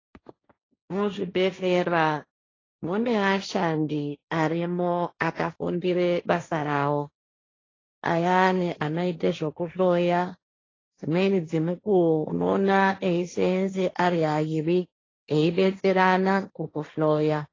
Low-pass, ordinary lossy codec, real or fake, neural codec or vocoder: 7.2 kHz; AAC, 32 kbps; fake; codec, 16 kHz, 1.1 kbps, Voila-Tokenizer